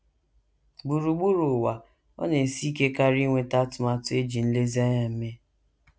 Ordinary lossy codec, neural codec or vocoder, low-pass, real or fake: none; none; none; real